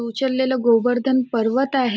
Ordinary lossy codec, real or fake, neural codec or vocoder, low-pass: none; real; none; none